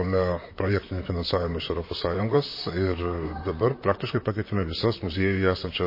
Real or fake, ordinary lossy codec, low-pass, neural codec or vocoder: fake; MP3, 24 kbps; 5.4 kHz; vocoder, 44.1 kHz, 128 mel bands, Pupu-Vocoder